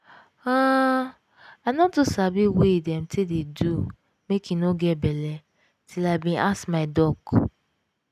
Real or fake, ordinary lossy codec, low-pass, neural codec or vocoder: real; none; 14.4 kHz; none